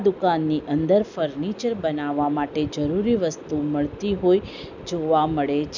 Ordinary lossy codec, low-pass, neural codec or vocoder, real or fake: none; 7.2 kHz; none; real